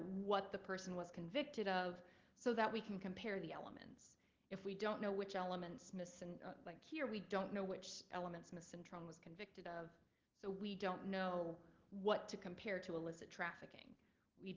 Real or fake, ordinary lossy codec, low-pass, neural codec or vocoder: real; Opus, 16 kbps; 7.2 kHz; none